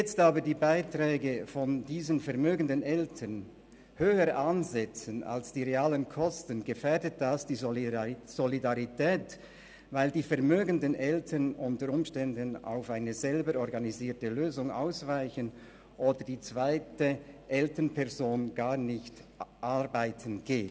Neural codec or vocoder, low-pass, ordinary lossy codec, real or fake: none; none; none; real